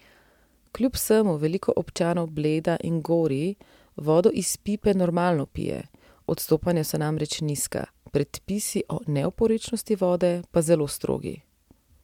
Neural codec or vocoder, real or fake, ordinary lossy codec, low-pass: none; real; MP3, 96 kbps; 19.8 kHz